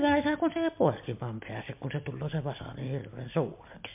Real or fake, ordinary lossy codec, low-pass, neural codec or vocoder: real; MP3, 32 kbps; 3.6 kHz; none